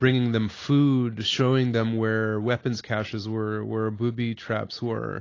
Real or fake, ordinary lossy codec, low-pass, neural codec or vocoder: real; AAC, 32 kbps; 7.2 kHz; none